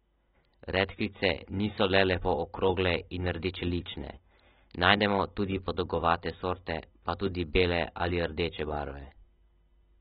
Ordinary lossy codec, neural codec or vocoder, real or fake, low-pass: AAC, 16 kbps; none; real; 7.2 kHz